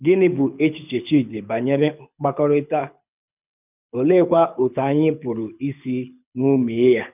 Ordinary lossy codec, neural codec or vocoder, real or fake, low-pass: none; codec, 24 kHz, 6 kbps, HILCodec; fake; 3.6 kHz